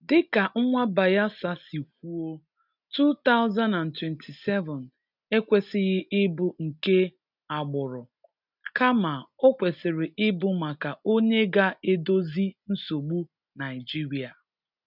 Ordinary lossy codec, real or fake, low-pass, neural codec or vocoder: none; real; 5.4 kHz; none